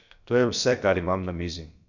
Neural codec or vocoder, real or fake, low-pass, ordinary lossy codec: codec, 16 kHz, about 1 kbps, DyCAST, with the encoder's durations; fake; 7.2 kHz; none